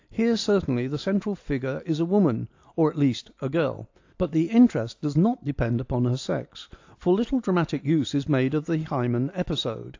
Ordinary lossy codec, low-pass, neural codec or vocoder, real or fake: AAC, 48 kbps; 7.2 kHz; none; real